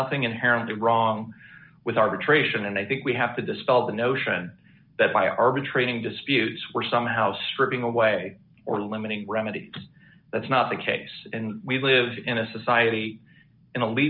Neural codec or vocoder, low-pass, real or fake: none; 5.4 kHz; real